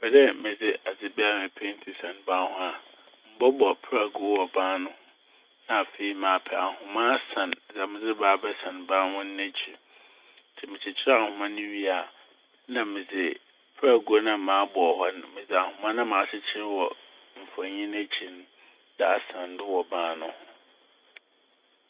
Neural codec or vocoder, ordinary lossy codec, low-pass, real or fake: none; Opus, 64 kbps; 3.6 kHz; real